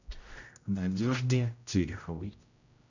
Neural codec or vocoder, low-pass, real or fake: codec, 16 kHz, 0.5 kbps, X-Codec, HuBERT features, trained on general audio; 7.2 kHz; fake